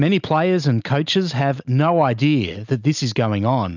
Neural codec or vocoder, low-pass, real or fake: none; 7.2 kHz; real